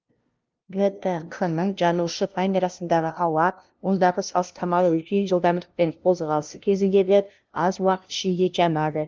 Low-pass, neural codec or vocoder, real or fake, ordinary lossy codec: 7.2 kHz; codec, 16 kHz, 0.5 kbps, FunCodec, trained on LibriTTS, 25 frames a second; fake; Opus, 32 kbps